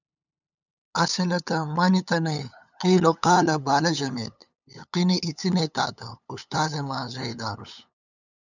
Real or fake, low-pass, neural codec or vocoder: fake; 7.2 kHz; codec, 16 kHz, 8 kbps, FunCodec, trained on LibriTTS, 25 frames a second